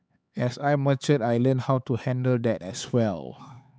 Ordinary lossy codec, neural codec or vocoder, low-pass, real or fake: none; codec, 16 kHz, 4 kbps, X-Codec, HuBERT features, trained on LibriSpeech; none; fake